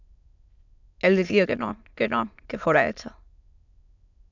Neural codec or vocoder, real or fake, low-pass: autoencoder, 22.05 kHz, a latent of 192 numbers a frame, VITS, trained on many speakers; fake; 7.2 kHz